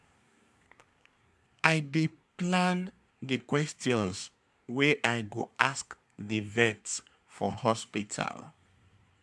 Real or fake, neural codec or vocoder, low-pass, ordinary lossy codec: fake; codec, 24 kHz, 1 kbps, SNAC; none; none